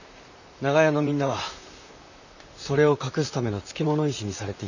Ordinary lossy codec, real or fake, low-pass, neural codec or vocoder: none; fake; 7.2 kHz; vocoder, 44.1 kHz, 128 mel bands, Pupu-Vocoder